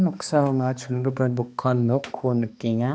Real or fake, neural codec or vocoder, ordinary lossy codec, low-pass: fake; codec, 16 kHz, 2 kbps, X-Codec, HuBERT features, trained on balanced general audio; none; none